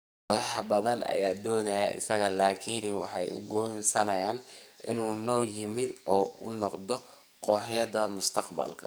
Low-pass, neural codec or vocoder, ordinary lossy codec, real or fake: none; codec, 44.1 kHz, 2.6 kbps, SNAC; none; fake